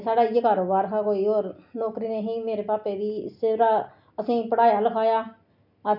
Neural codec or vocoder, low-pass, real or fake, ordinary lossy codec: none; 5.4 kHz; real; none